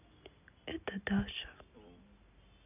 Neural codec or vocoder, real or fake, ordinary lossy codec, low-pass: none; real; none; 3.6 kHz